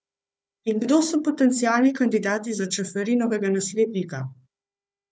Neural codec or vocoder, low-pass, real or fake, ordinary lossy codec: codec, 16 kHz, 4 kbps, FunCodec, trained on Chinese and English, 50 frames a second; none; fake; none